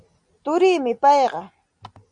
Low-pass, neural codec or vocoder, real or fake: 9.9 kHz; none; real